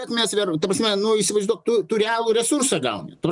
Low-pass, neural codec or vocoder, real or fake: 10.8 kHz; none; real